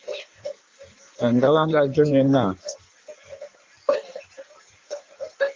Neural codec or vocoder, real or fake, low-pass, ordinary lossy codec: codec, 16 kHz in and 24 kHz out, 1.1 kbps, FireRedTTS-2 codec; fake; 7.2 kHz; Opus, 32 kbps